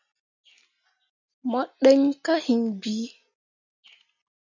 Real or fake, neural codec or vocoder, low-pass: real; none; 7.2 kHz